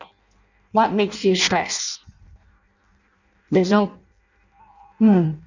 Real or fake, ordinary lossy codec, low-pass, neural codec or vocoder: fake; none; 7.2 kHz; codec, 16 kHz in and 24 kHz out, 0.6 kbps, FireRedTTS-2 codec